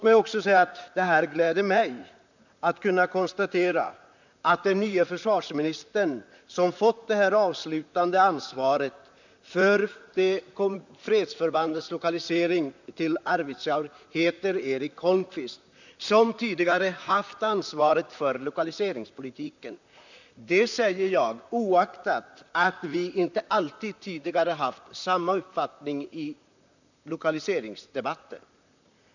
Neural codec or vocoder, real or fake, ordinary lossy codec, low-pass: vocoder, 44.1 kHz, 128 mel bands, Pupu-Vocoder; fake; none; 7.2 kHz